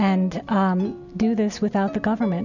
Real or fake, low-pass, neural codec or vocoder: real; 7.2 kHz; none